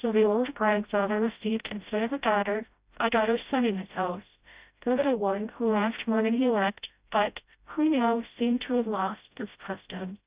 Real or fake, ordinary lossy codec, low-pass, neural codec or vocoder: fake; Opus, 64 kbps; 3.6 kHz; codec, 16 kHz, 0.5 kbps, FreqCodec, smaller model